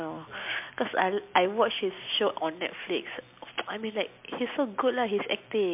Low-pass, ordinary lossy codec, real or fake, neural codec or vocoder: 3.6 kHz; MP3, 32 kbps; real; none